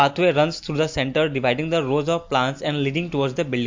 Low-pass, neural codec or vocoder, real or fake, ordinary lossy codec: 7.2 kHz; none; real; MP3, 48 kbps